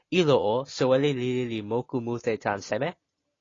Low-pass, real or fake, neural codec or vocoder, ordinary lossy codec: 7.2 kHz; real; none; AAC, 32 kbps